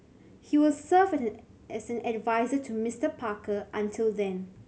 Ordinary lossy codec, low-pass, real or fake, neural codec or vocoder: none; none; real; none